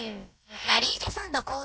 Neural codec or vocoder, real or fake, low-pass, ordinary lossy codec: codec, 16 kHz, about 1 kbps, DyCAST, with the encoder's durations; fake; none; none